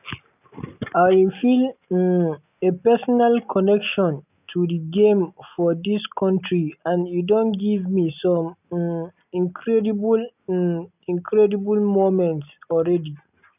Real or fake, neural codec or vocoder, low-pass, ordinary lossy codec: real; none; 3.6 kHz; none